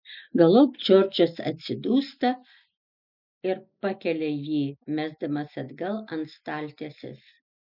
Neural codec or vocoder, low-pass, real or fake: none; 5.4 kHz; real